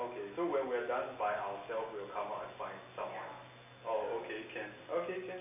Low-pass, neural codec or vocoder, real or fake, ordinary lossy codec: 3.6 kHz; none; real; MP3, 32 kbps